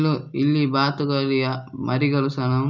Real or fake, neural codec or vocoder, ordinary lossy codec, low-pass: real; none; none; 7.2 kHz